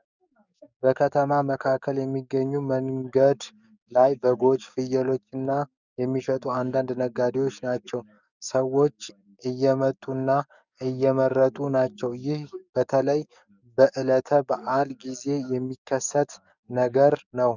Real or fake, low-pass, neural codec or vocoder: fake; 7.2 kHz; codec, 44.1 kHz, 7.8 kbps, DAC